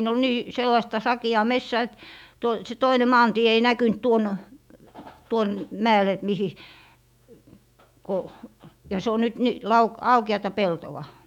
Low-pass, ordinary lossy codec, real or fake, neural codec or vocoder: 19.8 kHz; none; fake; autoencoder, 48 kHz, 128 numbers a frame, DAC-VAE, trained on Japanese speech